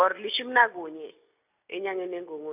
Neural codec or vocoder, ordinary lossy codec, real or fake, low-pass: none; none; real; 3.6 kHz